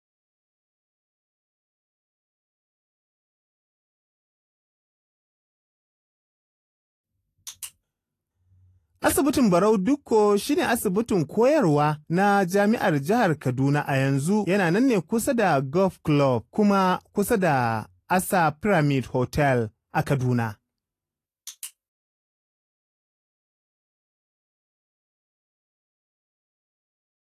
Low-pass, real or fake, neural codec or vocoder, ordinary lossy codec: 14.4 kHz; real; none; AAC, 48 kbps